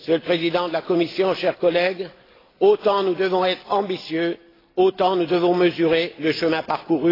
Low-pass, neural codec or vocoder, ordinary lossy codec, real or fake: 5.4 kHz; none; AAC, 24 kbps; real